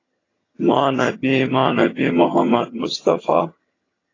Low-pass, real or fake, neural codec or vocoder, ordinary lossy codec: 7.2 kHz; fake; vocoder, 22.05 kHz, 80 mel bands, HiFi-GAN; AAC, 32 kbps